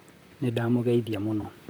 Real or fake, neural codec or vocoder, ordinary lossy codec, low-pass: fake; vocoder, 44.1 kHz, 128 mel bands, Pupu-Vocoder; none; none